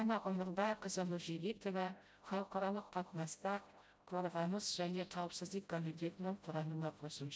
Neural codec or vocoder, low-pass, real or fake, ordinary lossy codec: codec, 16 kHz, 0.5 kbps, FreqCodec, smaller model; none; fake; none